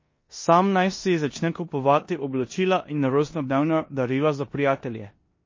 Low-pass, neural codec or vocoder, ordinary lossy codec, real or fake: 7.2 kHz; codec, 16 kHz in and 24 kHz out, 0.9 kbps, LongCat-Audio-Codec, four codebook decoder; MP3, 32 kbps; fake